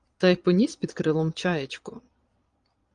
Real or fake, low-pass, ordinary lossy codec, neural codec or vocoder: fake; 9.9 kHz; Opus, 32 kbps; vocoder, 22.05 kHz, 80 mel bands, Vocos